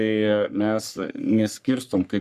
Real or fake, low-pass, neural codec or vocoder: fake; 14.4 kHz; codec, 44.1 kHz, 3.4 kbps, Pupu-Codec